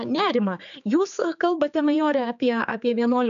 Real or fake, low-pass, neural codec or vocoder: fake; 7.2 kHz; codec, 16 kHz, 4 kbps, X-Codec, HuBERT features, trained on general audio